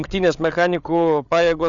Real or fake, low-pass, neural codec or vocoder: fake; 7.2 kHz; codec, 16 kHz, 8 kbps, FreqCodec, larger model